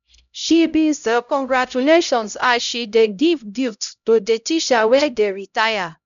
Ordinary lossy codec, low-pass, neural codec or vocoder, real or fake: none; 7.2 kHz; codec, 16 kHz, 0.5 kbps, X-Codec, HuBERT features, trained on LibriSpeech; fake